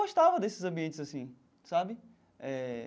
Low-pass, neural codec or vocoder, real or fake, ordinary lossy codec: none; none; real; none